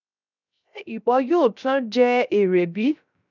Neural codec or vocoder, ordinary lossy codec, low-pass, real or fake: codec, 16 kHz, 0.3 kbps, FocalCodec; none; 7.2 kHz; fake